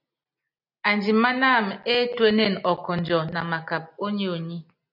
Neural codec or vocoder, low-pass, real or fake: none; 5.4 kHz; real